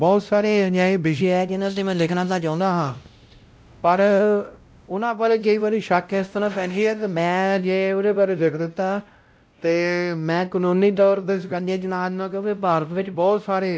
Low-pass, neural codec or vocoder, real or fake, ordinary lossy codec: none; codec, 16 kHz, 0.5 kbps, X-Codec, WavLM features, trained on Multilingual LibriSpeech; fake; none